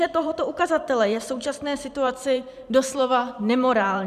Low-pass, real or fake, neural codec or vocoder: 14.4 kHz; fake; vocoder, 44.1 kHz, 128 mel bands every 512 samples, BigVGAN v2